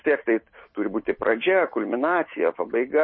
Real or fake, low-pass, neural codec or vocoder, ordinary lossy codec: real; 7.2 kHz; none; MP3, 24 kbps